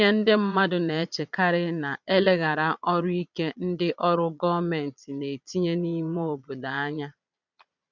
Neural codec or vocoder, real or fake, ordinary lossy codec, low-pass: vocoder, 22.05 kHz, 80 mel bands, Vocos; fake; none; 7.2 kHz